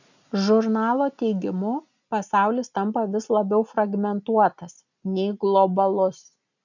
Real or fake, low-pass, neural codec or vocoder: real; 7.2 kHz; none